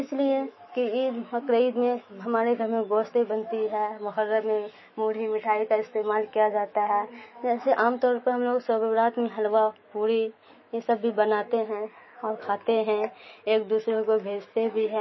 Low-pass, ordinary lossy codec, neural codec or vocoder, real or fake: 7.2 kHz; MP3, 24 kbps; autoencoder, 48 kHz, 128 numbers a frame, DAC-VAE, trained on Japanese speech; fake